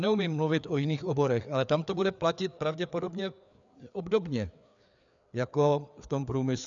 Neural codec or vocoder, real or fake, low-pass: codec, 16 kHz, 4 kbps, FreqCodec, larger model; fake; 7.2 kHz